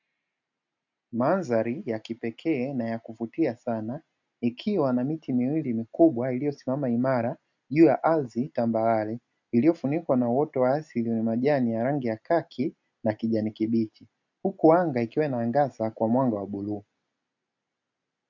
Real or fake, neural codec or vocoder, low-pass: real; none; 7.2 kHz